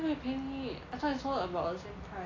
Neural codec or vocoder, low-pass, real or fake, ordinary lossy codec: none; 7.2 kHz; real; AAC, 32 kbps